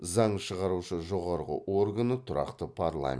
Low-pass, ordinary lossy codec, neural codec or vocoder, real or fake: none; none; none; real